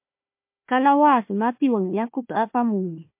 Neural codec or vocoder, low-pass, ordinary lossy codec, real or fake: codec, 16 kHz, 1 kbps, FunCodec, trained on Chinese and English, 50 frames a second; 3.6 kHz; MP3, 32 kbps; fake